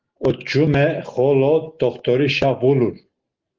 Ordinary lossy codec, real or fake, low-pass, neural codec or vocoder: Opus, 16 kbps; real; 7.2 kHz; none